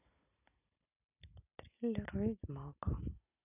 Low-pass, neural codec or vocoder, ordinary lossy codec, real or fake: 3.6 kHz; none; none; real